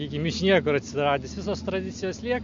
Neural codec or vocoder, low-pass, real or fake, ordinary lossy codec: none; 7.2 kHz; real; MP3, 48 kbps